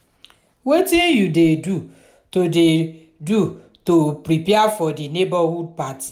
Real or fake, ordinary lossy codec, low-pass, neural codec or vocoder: real; none; 19.8 kHz; none